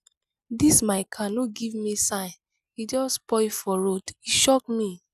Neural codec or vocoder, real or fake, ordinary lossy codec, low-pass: none; real; none; 14.4 kHz